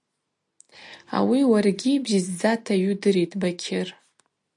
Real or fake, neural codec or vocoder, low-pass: real; none; 10.8 kHz